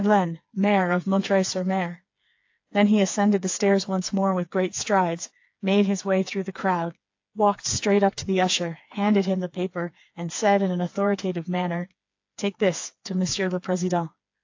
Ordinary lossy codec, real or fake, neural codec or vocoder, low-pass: AAC, 48 kbps; fake; codec, 16 kHz, 4 kbps, FreqCodec, smaller model; 7.2 kHz